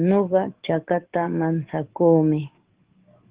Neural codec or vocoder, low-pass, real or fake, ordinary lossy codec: codec, 44.1 kHz, 7.8 kbps, DAC; 3.6 kHz; fake; Opus, 16 kbps